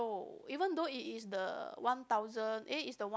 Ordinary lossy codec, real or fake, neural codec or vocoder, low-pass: none; real; none; none